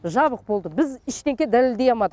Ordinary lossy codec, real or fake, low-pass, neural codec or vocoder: none; real; none; none